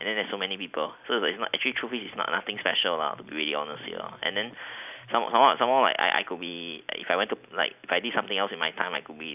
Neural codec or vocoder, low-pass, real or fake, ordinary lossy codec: none; 3.6 kHz; real; none